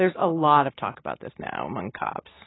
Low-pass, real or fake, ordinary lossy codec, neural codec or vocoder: 7.2 kHz; real; AAC, 16 kbps; none